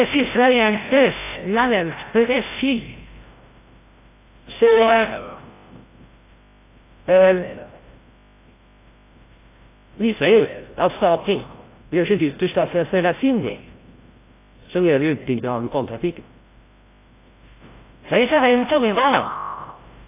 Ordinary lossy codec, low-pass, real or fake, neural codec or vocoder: AAC, 24 kbps; 3.6 kHz; fake; codec, 16 kHz, 0.5 kbps, FreqCodec, larger model